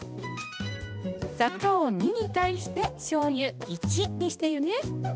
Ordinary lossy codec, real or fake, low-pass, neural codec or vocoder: none; fake; none; codec, 16 kHz, 1 kbps, X-Codec, HuBERT features, trained on balanced general audio